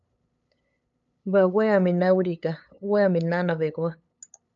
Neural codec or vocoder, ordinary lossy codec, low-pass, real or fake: codec, 16 kHz, 8 kbps, FunCodec, trained on LibriTTS, 25 frames a second; AAC, 64 kbps; 7.2 kHz; fake